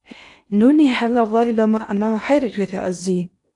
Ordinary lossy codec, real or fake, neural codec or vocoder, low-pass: AAC, 64 kbps; fake; codec, 16 kHz in and 24 kHz out, 0.8 kbps, FocalCodec, streaming, 65536 codes; 10.8 kHz